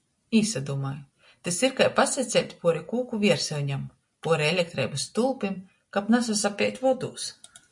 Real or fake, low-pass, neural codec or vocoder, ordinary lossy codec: real; 10.8 kHz; none; MP3, 48 kbps